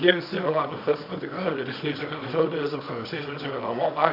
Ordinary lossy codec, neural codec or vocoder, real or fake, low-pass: AAC, 48 kbps; codec, 24 kHz, 0.9 kbps, WavTokenizer, small release; fake; 5.4 kHz